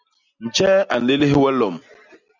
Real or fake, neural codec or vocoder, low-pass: real; none; 7.2 kHz